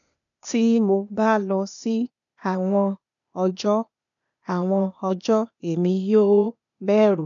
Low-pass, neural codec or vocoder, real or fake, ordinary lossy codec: 7.2 kHz; codec, 16 kHz, 0.8 kbps, ZipCodec; fake; none